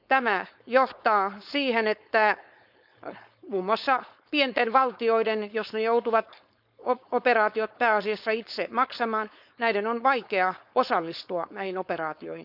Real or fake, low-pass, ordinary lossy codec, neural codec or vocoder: fake; 5.4 kHz; none; codec, 16 kHz, 4.8 kbps, FACodec